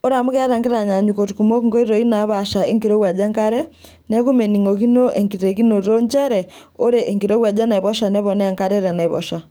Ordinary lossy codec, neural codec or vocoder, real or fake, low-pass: none; codec, 44.1 kHz, 7.8 kbps, DAC; fake; none